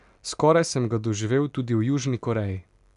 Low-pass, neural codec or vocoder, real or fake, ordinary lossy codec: 10.8 kHz; none; real; none